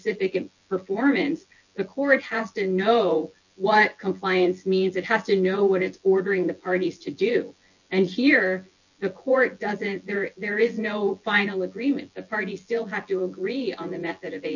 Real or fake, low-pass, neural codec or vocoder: fake; 7.2 kHz; vocoder, 24 kHz, 100 mel bands, Vocos